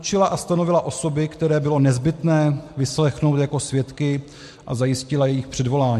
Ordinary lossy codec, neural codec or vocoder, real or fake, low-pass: MP3, 64 kbps; none; real; 14.4 kHz